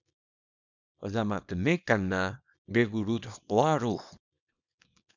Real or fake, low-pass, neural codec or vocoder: fake; 7.2 kHz; codec, 24 kHz, 0.9 kbps, WavTokenizer, small release